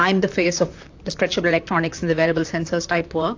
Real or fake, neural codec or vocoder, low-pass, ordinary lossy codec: fake; vocoder, 44.1 kHz, 128 mel bands, Pupu-Vocoder; 7.2 kHz; AAC, 48 kbps